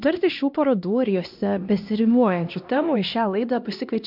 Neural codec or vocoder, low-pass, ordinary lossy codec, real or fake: codec, 16 kHz, 1 kbps, X-Codec, HuBERT features, trained on LibriSpeech; 5.4 kHz; MP3, 48 kbps; fake